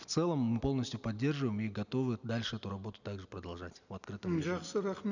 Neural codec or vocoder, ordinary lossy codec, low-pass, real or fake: none; none; 7.2 kHz; real